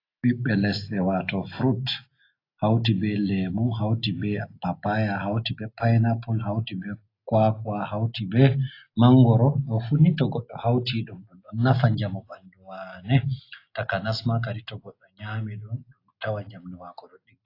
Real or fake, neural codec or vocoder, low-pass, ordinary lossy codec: real; none; 5.4 kHz; AAC, 32 kbps